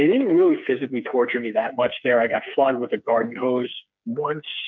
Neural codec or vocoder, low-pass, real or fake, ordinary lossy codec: codec, 16 kHz, 4 kbps, FreqCodec, smaller model; 7.2 kHz; fake; MP3, 64 kbps